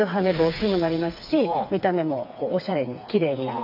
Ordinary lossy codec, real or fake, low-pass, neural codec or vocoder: AAC, 48 kbps; fake; 5.4 kHz; codec, 16 kHz, 4 kbps, FreqCodec, smaller model